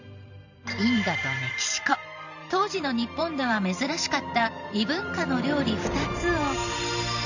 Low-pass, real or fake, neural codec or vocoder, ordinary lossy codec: 7.2 kHz; fake; vocoder, 44.1 kHz, 128 mel bands every 512 samples, BigVGAN v2; none